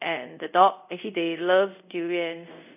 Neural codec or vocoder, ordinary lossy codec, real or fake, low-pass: codec, 24 kHz, 0.5 kbps, DualCodec; none; fake; 3.6 kHz